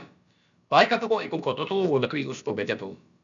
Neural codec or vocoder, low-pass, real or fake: codec, 16 kHz, about 1 kbps, DyCAST, with the encoder's durations; 7.2 kHz; fake